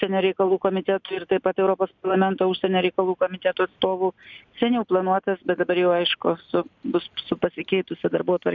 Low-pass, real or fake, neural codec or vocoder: 7.2 kHz; real; none